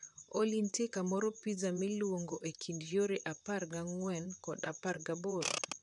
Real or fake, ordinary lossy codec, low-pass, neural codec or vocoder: fake; none; 10.8 kHz; vocoder, 44.1 kHz, 128 mel bands, Pupu-Vocoder